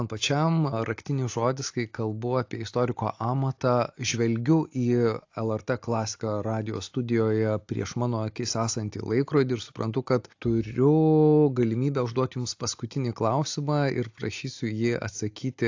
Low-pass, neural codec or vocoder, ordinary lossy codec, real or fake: 7.2 kHz; none; AAC, 48 kbps; real